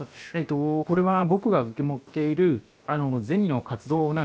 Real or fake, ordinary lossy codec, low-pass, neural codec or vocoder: fake; none; none; codec, 16 kHz, about 1 kbps, DyCAST, with the encoder's durations